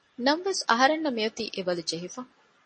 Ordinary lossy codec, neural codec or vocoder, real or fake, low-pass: MP3, 32 kbps; none; real; 9.9 kHz